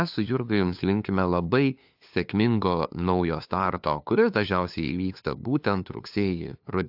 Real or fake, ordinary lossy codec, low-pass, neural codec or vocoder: fake; AAC, 48 kbps; 5.4 kHz; codec, 16 kHz, 2 kbps, FunCodec, trained on LibriTTS, 25 frames a second